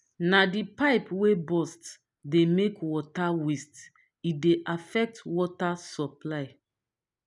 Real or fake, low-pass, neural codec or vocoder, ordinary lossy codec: real; 10.8 kHz; none; none